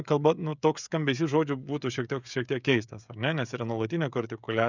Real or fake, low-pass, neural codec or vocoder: fake; 7.2 kHz; codec, 16 kHz, 16 kbps, FreqCodec, smaller model